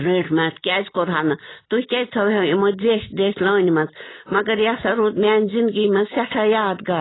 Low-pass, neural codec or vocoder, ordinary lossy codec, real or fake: 7.2 kHz; none; AAC, 16 kbps; real